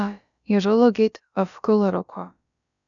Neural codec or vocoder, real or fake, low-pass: codec, 16 kHz, about 1 kbps, DyCAST, with the encoder's durations; fake; 7.2 kHz